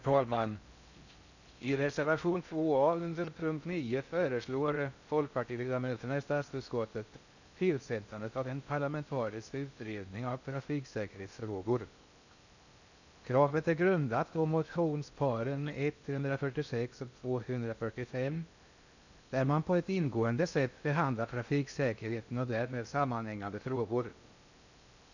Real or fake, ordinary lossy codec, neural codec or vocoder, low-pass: fake; none; codec, 16 kHz in and 24 kHz out, 0.6 kbps, FocalCodec, streaming, 4096 codes; 7.2 kHz